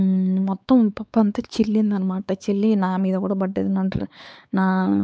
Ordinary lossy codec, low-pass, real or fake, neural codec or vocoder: none; none; fake; codec, 16 kHz, 4 kbps, X-Codec, WavLM features, trained on Multilingual LibriSpeech